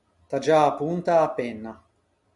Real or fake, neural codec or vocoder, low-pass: real; none; 10.8 kHz